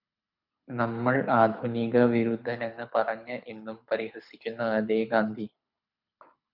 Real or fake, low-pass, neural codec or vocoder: fake; 5.4 kHz; codec, 24 kHz, 6 kbps, HILCodec